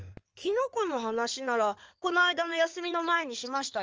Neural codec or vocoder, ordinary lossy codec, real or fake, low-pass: codec, 16 kHz in and 24 kHz out, 2.2 kbps, FireRedTTS-2 codec; Opus, 24 kbps; fake; 7.2 kHz